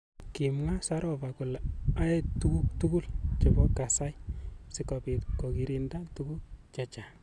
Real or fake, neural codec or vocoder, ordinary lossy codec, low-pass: real; none; none; none